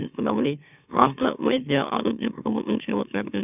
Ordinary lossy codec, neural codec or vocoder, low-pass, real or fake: none; autoencoder, 44.1 kHz, a latent of 192 numbers a frame, MeloTTS; 3.6 kHz; fake